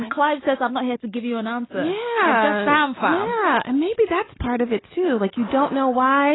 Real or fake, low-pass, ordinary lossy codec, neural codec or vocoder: real; 7.2 kHz; AAC, 16 kbps; none